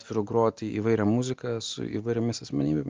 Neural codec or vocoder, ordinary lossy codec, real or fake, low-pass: none; Opus, 32 kbps; real; 7.2 kHz